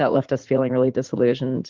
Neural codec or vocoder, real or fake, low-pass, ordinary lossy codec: vocoder, 44.1 kHz, 80 mel bands, Vocos; fake; 7.2 kHz; Opus, 16 kbps